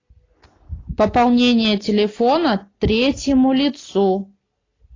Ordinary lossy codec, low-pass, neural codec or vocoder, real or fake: AAC, 32 kbps; 7.2 kHz; none; real